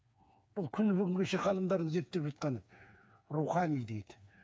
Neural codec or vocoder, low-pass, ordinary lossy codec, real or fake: codec, 16 kHz, 4 kbps, FreqCodec, smaller model; none; none; fake